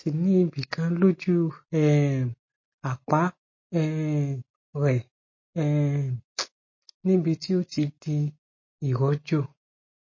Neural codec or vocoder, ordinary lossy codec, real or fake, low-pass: none; MP3, 32 kbps; real; 7.2 kHz